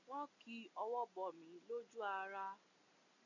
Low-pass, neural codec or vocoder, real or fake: 7.2 kHz; none; real